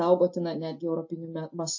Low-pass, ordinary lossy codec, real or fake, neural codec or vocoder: 7.2 kHz; MP3, 32 kbps; real; none